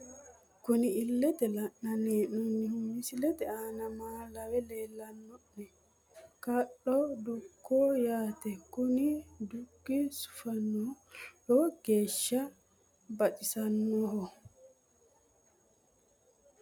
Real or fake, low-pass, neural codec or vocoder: real; 19.8 kHz; none